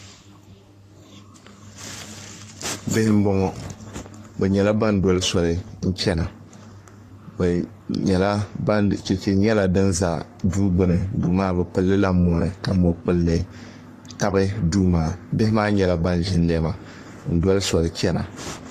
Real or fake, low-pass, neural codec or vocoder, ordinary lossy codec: fake; 14.4 kHz; codec, 44.1 kHz, 3.4 kbps, Pupu-Codec; AAC, 48 kbps